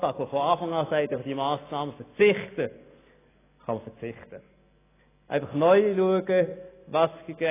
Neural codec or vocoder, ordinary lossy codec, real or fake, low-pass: none; AAC, 16 kbps; real; 3.6 kHz